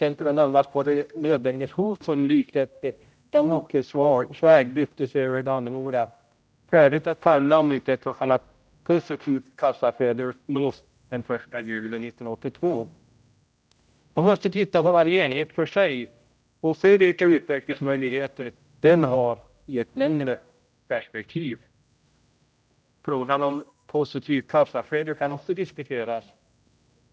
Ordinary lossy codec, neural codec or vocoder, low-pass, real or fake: none; codec, 16 kHz, 0.5 kbps, X-Codec, HuBERT features, trained on general audio; none; fake